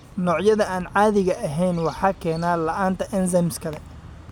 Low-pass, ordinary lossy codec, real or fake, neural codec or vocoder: 19.8 kHz; none; real; none